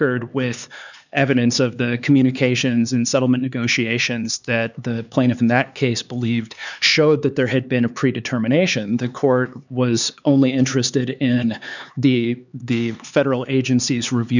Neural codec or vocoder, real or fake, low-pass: codec, 16 kHz, 4 kbps, X-Codec, HuBERT features, trained on LibriSpeech; fake; 7.2 kHz